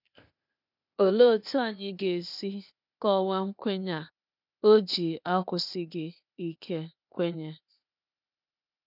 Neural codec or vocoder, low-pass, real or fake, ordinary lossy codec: codec, 16 kHz, 0.8 kbps, ZipCodec; 5.4 kHz; fake; none